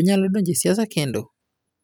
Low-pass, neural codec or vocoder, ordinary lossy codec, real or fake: 19.8 kHz; none; none; real